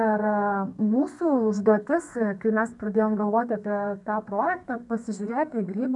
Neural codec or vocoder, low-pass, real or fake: codec, 32 kHz, 1.9 kbps, SNAC; 10.8 kHz; fake